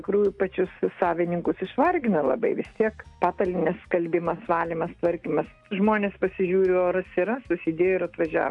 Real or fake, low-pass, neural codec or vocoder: real; 10.8 kHz; none